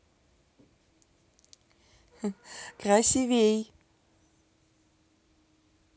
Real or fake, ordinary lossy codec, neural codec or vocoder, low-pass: real; none; none; none